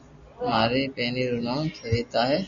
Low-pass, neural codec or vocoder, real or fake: 7.2 kHz; none; real